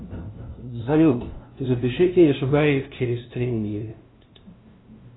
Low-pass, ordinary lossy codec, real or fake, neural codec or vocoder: 7.2 kHz; AAC, 16 kbps; fake; codec, 16 kHz, 0.5 kbps, FunCodec, trained on LibriTTS, 25 frames a second